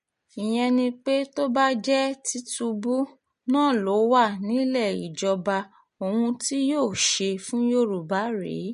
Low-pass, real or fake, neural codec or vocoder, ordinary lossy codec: 10.8 kHz; real; none; MP3, 48 kbps